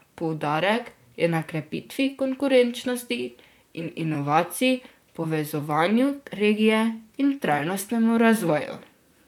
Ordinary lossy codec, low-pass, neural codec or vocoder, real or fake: none; 19.8 kHz; vocoder, 44.1 kHz, 128 mel bands, Pupu-Vocoder; fake